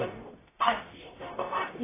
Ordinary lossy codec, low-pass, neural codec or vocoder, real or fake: AAC, 16 kbps; 3.6 kHz; codec, 44.1 kHz, 0.9 kbps, DAC; fake